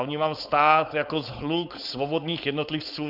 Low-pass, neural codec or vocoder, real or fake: 5.4 kHz; codec, 16 kHz, 4.8 kbps, FACodec; fake